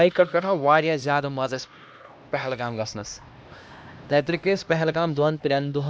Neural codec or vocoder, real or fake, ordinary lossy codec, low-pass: codec, 16 kHz, 1 kbps, X-Codec, HuBERT features, trained on LibriSpeech; fake; none; none